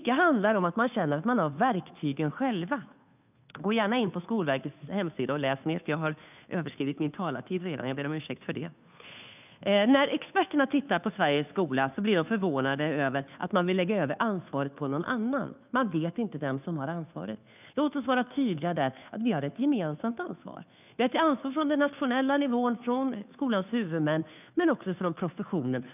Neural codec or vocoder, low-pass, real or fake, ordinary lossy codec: codec, 16 kHz, 2 kbps, FunCodec, trained on Chinese and English, 25 frames a second; 3.6 kHz; fake; none